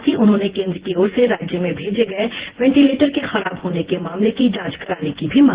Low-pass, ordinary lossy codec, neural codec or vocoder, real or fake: 3.6 kHz; Opus, 16 kbps; vocoder, 24 kHz, 100 mel bands, Vocos; fake